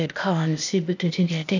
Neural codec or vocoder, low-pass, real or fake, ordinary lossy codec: codec, 16 kHz, 0.8 kbps, ZipCodec; 7.2 kHz; fake; none